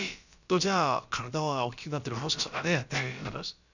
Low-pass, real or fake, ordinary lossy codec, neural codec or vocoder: 7.2 kHz; fake; none; codec, 16 kHz, about 1 kbps, DyCAST, with the encoder's durations